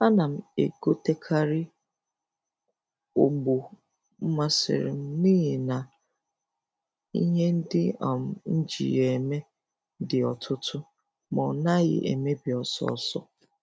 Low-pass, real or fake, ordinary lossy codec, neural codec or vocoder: none; real; none; none